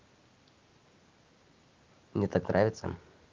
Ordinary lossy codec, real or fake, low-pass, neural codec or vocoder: Opus, 32 kbps; real; 7.2 kHz; none